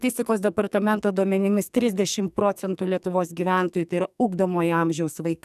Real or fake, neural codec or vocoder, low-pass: fake; codec, 44.1 kHz, 2.6 kbps, SNAC; 14.4 kHz